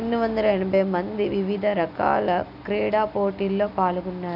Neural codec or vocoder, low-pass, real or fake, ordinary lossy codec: none; 5.4 kHz; real; none